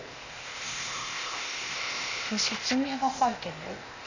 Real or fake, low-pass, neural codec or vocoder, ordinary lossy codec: fake; 7.2 kHz; codec, 16 kHz, 0.8 kbps, ZipCodec; none